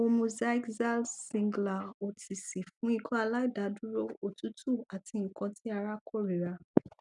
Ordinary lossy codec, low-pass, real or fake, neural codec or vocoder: none; 10.8 kHz; real; none